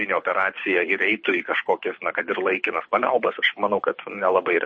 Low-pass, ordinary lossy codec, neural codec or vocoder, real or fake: 9.9 kHz; MP3, 32 kbps; codec, 24 kHz, 6 kbps, HILCodec; fake